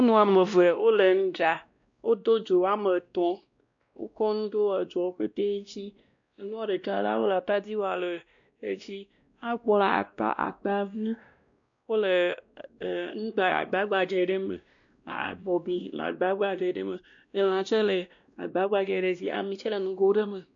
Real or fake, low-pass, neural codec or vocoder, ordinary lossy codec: fake; 7.2 kHz; codec, 16 kHz, 1 kbps, X-Codec, WavLM features, trained on Multilingual LibriSpeech; MP3, 64 kbps